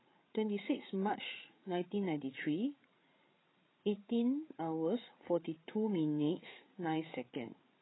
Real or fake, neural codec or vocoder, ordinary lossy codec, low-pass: fake; codec, 16 kHz, 8 kbps, FreqCodec, larger model; AAC, 16 kbps; 7.2 kHz